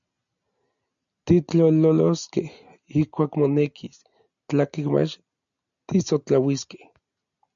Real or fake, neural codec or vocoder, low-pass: real; none; 7.2 kHz